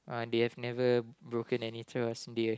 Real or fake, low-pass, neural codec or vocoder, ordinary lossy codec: real; none; none; none